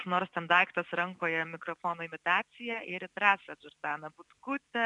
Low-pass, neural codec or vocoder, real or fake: 10.8 kHz; none; real